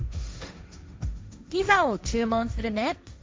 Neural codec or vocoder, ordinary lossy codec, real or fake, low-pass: codec, 16 kHz, 1.1 kbps, Voila-Tokenizer; none; fake; none